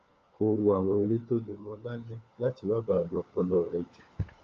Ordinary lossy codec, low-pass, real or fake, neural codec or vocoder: Opus, 24 kbps; 7.2 kHz; fake; codec, 16 kHz, 4 kbps, FunCodec, trained on LibriTTS, 50 frames a second